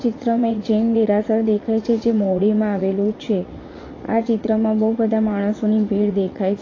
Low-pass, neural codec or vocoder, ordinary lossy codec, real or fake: 7.2 kHz; vocoder, 44.1 kHz, 80 mel bands, Vocos; AAC, 32 kbps; fake